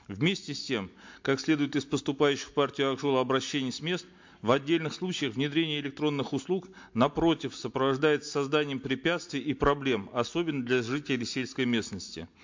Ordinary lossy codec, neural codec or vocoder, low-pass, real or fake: MP3, 48 kbps; none; 7.2 kHz; real